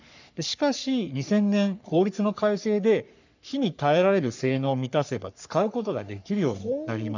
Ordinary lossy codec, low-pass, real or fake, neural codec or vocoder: none; 7.2 kHz; fake; codec, 44.1 kHz, 3.4 kbps, Pupu-Codec